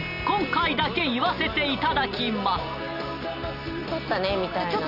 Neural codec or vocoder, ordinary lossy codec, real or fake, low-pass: none; none; real; 5.4 kHz